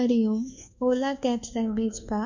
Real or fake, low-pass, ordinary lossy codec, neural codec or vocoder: fake; 7.2 kHz; none; codec, 24 kHz, 1.2 kbps, DualCodec